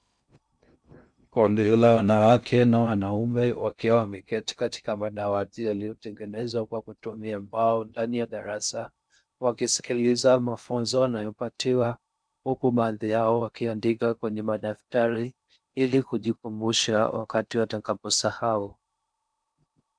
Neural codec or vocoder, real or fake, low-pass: codec, 16 kHz in and 24 kHz out, 0.6 kbps, FocalCodec, streaming, 2048 codes; fake; 9.9 kHz